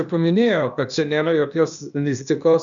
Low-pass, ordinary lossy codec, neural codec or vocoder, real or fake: 7.2 kHz; MP3, 96 kbps; codec, 16 kHz, 0.8 kbps, ZipCodec; fake